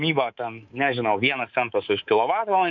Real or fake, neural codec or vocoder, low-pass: fake; codec, 24 kHz, 3.1 kbps, DualCodec; 7.2 kHz